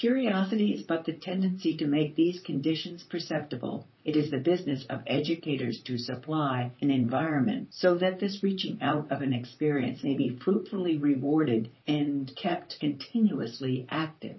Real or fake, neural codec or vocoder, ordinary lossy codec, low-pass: fake; vocoder, 44.1 kHz, 128 mel bands, Pupu-Vocoder; MP3, 24 kbps; 7.2 kHz